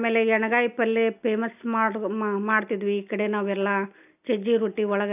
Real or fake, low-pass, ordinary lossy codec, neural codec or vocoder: real; 3.6 kHz; AAC, 32 kbps; none